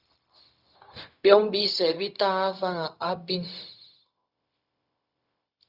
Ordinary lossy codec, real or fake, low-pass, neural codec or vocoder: Opus, 64 kbps; fake; 5.4 kHz; codec, 16 kHz, 0.4 kbps, LongCat-Audio-Codec